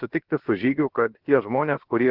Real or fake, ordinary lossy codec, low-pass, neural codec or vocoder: fake; Opus, 16 kbps; 5.4 kHz; codec, 16 kHz, about 1 kbps, DyCAST, with the encoder's durations